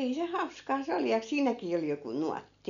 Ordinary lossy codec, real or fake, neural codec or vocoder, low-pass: none; real; none; 7.2 kHz